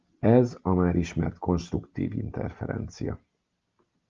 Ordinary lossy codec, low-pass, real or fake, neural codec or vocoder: Opus, 32 kbps; 7.2 kHz; real; none